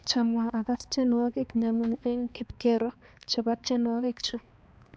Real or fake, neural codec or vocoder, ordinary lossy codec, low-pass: fake; codec, 16 kHz, 2 kbps, X-Codec, HuBERT features, trained on balanced general audio; none; none